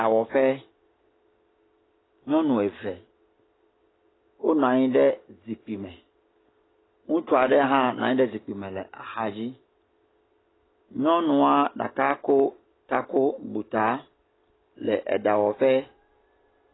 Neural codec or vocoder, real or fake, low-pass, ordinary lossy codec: none; real; 7.2 kHz; AAC, 16 kbps